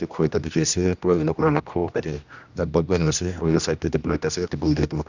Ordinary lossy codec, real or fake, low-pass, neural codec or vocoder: none; fake; 7.2 kHz; codec, 16 kHz, 1 kbps, X-Codec, HuBERT features, trained on general audio